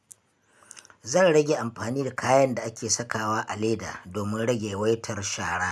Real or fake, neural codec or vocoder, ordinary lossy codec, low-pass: real; none; none; none